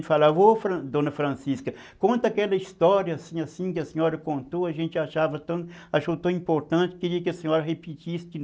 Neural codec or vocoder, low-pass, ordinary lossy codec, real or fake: none; none; none; real